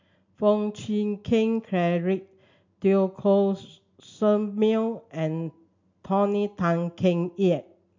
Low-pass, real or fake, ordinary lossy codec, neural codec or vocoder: 7.2 kHz; real; MP3, 64 kbps; none